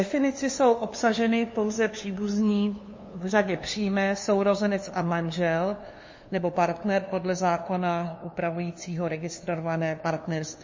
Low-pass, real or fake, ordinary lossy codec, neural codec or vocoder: 7.2 kHz; fake; MP3, 32 kbps; codec, 16 kHz, 2 kbps, FunCodec, trained on LibriTTS, 25 frames a second